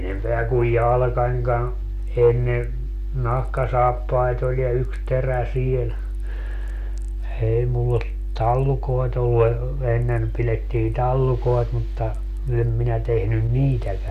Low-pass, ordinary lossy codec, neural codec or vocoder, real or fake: 14.4 kHz; none; none; real